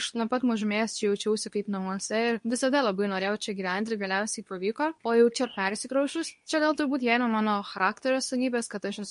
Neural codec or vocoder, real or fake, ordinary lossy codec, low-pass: codec, 24 kHz, 0.9 kbps, WavTokenizer, medium speech release version 2; fake; MP3, 48 kbps; 10.8 kHz